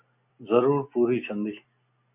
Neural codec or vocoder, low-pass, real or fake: none; 3.6 kHz; real